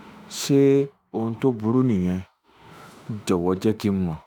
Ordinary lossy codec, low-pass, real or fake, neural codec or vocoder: none; none; fake; autoencoder, 48 kHz, 32 numbers a frame, DAC-VAE, trained on Japanese speech